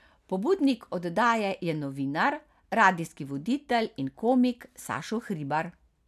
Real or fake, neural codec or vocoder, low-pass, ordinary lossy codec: real; none; 14.4 kHz; none